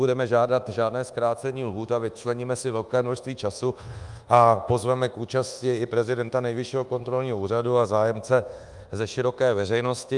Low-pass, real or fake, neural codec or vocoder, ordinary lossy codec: 10.8 kHz; fake; codec, 24 kHz, 1.2 kbps, DualCodec; Opus, 32 kbps